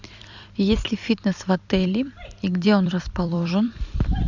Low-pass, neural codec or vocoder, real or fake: 7.2 kHz; vocoder, 44.1 kHz, 128 mel bands every 256 samples, BigVGAN v2; fake